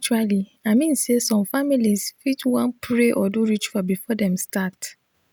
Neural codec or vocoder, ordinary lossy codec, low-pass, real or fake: none; none; none; real